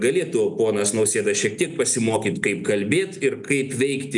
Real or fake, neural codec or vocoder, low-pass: real; none; 10.8 kHz